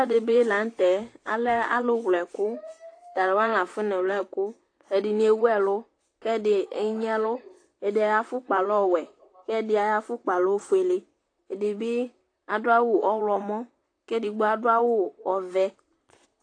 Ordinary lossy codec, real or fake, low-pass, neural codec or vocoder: AAC, 48 kbps; fake; 9.9 kHz; vocoder, 44.1 kHz, 128 mel bands, Pupu-Vocoder